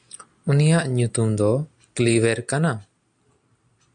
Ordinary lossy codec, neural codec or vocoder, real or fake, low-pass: MP3, 96 kbps; none; real; 9.9 kHz